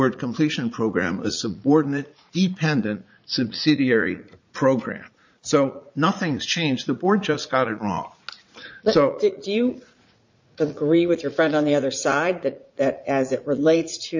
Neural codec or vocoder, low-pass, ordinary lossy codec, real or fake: vocoder, 44.1 kHz, 80 mel bands, Vocos; 7.2 kHz; MP3, 64 kbps; fake